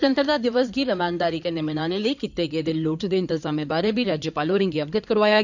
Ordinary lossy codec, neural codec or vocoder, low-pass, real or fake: none; codec, 16 kHz in and 24 kHz out, 2.2 kbps, FireRedTTS-2 codec; 7.2 kHz; fake